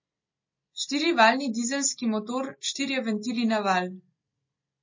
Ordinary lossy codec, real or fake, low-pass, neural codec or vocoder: MP3, 32 kbps; real; 7.2 kHz; none